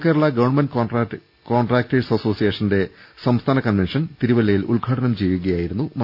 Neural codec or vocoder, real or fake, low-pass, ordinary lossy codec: none; real; 5.4 kHz; none